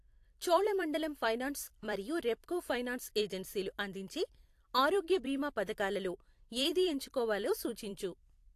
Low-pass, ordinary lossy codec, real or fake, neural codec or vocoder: 14.4 kHz; AAC, 64 kbps; fake; vocoder, 44.1 kHz, 128 mel bands every 256 samples, BigVGAN v2